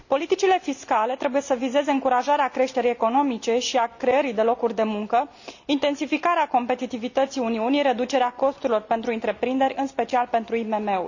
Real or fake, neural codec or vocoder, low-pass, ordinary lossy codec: real; none; 7.2 kHz; none